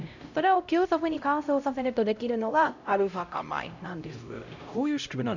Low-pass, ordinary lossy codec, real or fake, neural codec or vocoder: 7.2 kHz; none; fake; codec, 16 kHz, 0.5 kbps, X-Codec, HuBERT features, trained on LibriSpeech